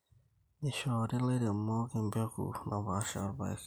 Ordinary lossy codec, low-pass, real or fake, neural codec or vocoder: none; none; real; none